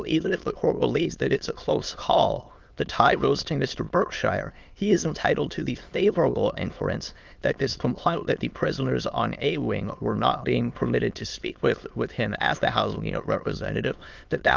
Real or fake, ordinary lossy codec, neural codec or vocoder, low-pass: fake; Opus, 24 kbps; autoencoder, 22.05 kHz, a latent of 192 numbers a frame, VITS, trained on many speakers; 7.2 kHz